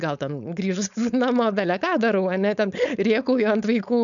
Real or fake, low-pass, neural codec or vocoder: fake; 7.2 kHz; codec, 16 kHz, 4.8 kbps, FACodec